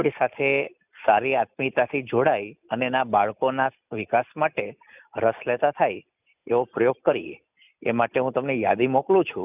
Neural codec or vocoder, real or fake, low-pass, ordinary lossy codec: none; real; 3.6 kHz; none